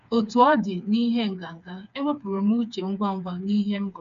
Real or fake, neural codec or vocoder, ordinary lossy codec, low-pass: fake; codec, 16 kHz, 4 kbps, FreqCodec, smaller model; none; 7.2 kHz